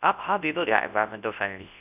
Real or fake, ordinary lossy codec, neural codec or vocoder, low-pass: fake; none; codec, 24 kHz, 0.9 kbps, WavTokenizer, large speech release; 3.6 kHz